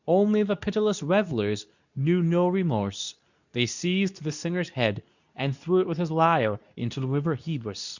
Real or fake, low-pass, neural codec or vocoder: fake; 7.2 kHz; codec, 24 kHz, 0.9 kbps, WavTokenizer, medium speech release version 2